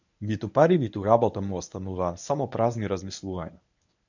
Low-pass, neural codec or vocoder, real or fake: 7.2 kHz; codec, 24 kHz, 0.9 kbps, WavTokenizer, medium speech release version 1; fake